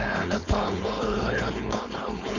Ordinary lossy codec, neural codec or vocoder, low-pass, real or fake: none; codec, 16 kHz, 4.8 kbps, FACodec; 7.2 kHz; fake